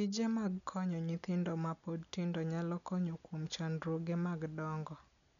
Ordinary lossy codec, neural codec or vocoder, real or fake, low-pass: none; none; real; 7.2 kHz